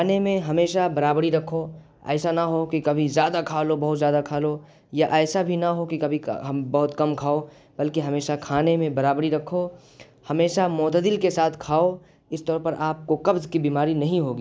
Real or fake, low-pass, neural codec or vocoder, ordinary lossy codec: real; none; none; none